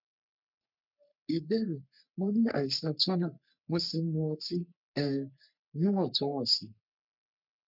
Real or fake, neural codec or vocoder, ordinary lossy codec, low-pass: fake; codec, 44.1 kHz, 3.4 kbps, Pupu-Codec; none; 5.4 kHz